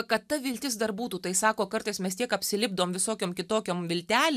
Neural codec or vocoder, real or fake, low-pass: none; real; 14.4 kHz